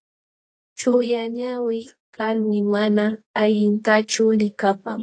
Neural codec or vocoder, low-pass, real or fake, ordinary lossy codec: codec, 24 kHz, 0.9 kbps, WavTokenizer, medium music audio release; 9.9 kHz; fake; AAC, 48 kbps